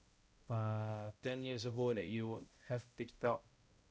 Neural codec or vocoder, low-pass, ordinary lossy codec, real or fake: codec, 16 kHz, 0.5 kbps, X-Codec, HuBERT features, trained on balanced general audio; none; none; fake